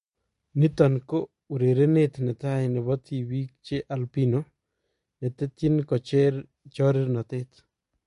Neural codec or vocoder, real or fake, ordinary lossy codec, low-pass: none; real; MP3, 48 kbps; 10.8 kHz